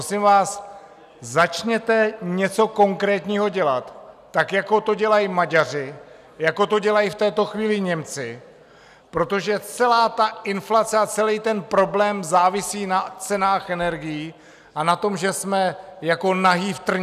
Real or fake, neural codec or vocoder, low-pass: real; none; 14.4 kHz